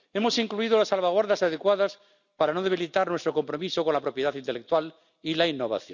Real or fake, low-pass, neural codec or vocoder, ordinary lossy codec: real; 7.2 kHz; none; none